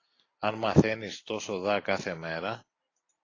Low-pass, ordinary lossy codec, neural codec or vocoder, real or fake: 7.2 kHz; AAC, 32 kbps; none; real